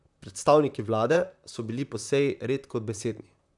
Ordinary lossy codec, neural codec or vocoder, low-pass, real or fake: none; vocoder, 44.1 kHz, 128 mel bands, Pupu-Vocoder; 10.8 kHz; fake